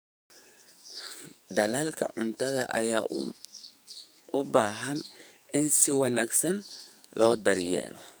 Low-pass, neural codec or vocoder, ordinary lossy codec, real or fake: none; codec, 44.1 kHz, 2.6 kbps, SNAC; none; fake